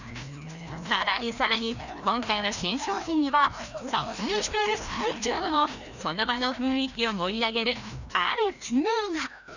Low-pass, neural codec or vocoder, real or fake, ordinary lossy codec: 7.2 kHz; codec, 16 kHz, 1 kbps, FreqCodec, larger model; fake; none